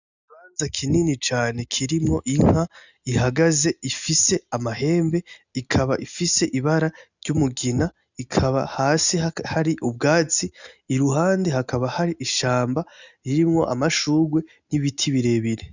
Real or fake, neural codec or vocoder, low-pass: real; none; 7.2 kHz